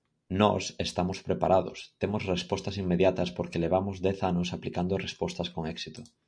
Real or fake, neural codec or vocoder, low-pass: real; none; 9.9 kHz